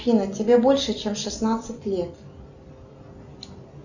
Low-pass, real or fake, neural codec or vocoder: 7.2 kHz; real; none